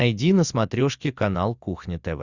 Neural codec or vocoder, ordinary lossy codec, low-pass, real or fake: none; Opus, 64 kbps; 7.2 kHz; real